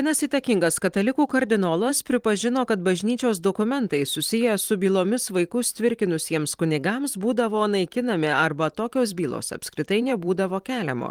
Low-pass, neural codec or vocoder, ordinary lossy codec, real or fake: 19.8 kHz; vocoder, 44.1 kHz, 128 mel bands every 512 samples, BigVGAN v2; Opus, 24 kbps; fake